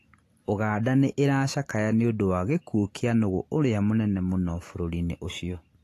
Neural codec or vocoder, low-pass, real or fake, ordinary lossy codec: none; 14.4 kHz; real; AAC, 48 kbps